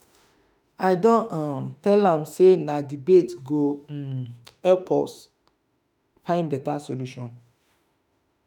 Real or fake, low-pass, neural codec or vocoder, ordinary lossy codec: fake; 19.8 kHz; autoencoder, 48 kHz, 32 numbers a frame, DAC-VAE, trained on Japanese speech; none